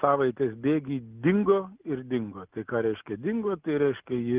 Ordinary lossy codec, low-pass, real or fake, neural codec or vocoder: Opus, 24 kbps; 3.6 kHz; real; none